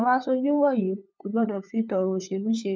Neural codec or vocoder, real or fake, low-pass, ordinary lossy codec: codec, 16 kHz, 8 kbps, FunCodec, trained on LibriTTS, 25 frames a second; fake; none; none